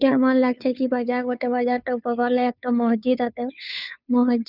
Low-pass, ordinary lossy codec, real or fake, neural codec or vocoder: 5.4 kHz; none; fake; codec, 16 kHz, 2 kbps, FunCodec, trained on Chinese and English, 25 frames a second